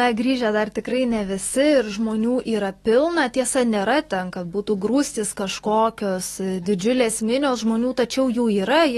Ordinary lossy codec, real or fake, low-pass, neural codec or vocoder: AAC, 32 kbps; fake; 19.8 kHz; autoencoder, 48 kHz, 128 numbers a frame, DAC-VAE, trained on Japanese speech